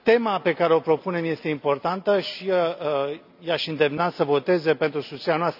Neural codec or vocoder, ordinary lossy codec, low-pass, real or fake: none; none; 5.4 kHz; real